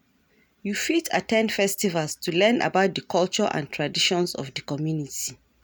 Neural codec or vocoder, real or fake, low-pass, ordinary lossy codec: none; real; none; none